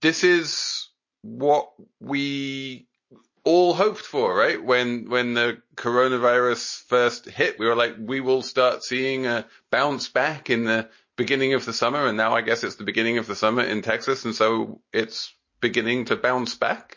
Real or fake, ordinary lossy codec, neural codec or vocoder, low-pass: real; MP3, 32 kbps; none; 7.2 kHz